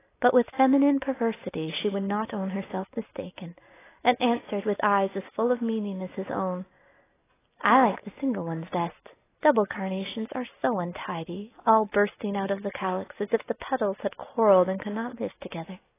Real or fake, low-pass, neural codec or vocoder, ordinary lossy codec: real; 3.6 kHz; none; AAC, 16 kbps